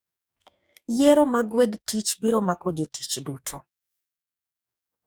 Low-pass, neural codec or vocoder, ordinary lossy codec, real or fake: none; codec, 44.1 kHz, 2.6 kbps, DAC; none; fake